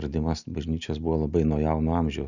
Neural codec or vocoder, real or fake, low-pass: none; real; 7.2 kHz